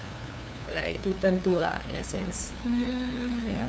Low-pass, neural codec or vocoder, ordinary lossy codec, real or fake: none; codec, 16 kHz, 2 kbps, FunCodec, trained on LibriTTS, 25 frames a second; none; fake